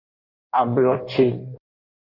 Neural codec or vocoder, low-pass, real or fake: codec, 16 kHz in and 24 kHz out, 1.1 kbps, FireRedTTS-2 codec; 5.4 kHz; fake